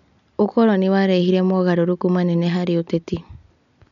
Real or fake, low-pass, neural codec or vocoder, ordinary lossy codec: real; 7.2 kHz; none; none